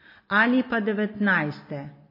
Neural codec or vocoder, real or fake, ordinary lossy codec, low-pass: none; real; MP3, 24 kbps; 5.4 kHz